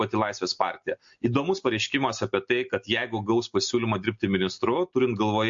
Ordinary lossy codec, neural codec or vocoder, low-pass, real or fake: MP3, 48 kbps; none; 7.2 kHz; real